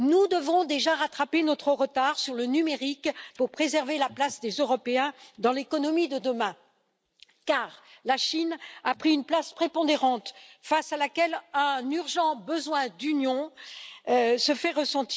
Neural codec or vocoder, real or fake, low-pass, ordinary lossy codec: none; real; none; none